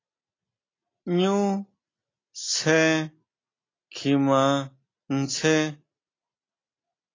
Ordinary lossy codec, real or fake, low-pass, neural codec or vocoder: AAC, 32 kbps; real; 7.2 kHz; none